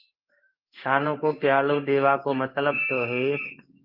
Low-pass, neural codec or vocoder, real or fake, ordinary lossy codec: 5.4 kHz; codec, 16 kHz in and 24 kHz out, 1 kbps, XY-Tokenizer; fake; Opus, 24 kbps